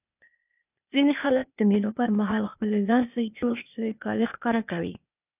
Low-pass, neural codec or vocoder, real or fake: 3.6 kHz; codec, 16 kHz, 0.8 kbps, ZipCodec; fake